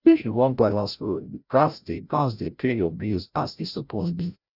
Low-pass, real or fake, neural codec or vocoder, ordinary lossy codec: 5.4 kHz; fake; codec, 16 kHz, 0.5 kbps, FreqCodec, larger model; none